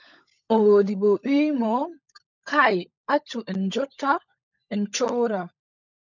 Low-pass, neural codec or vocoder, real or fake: 7.2 kHz; codec, 16 kHz, 16 kbps, FunCodec, trained on LibriTTS, 50 frames a second; fake